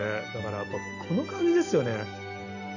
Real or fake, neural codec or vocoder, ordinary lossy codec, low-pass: real; none; none; 7.2 kHz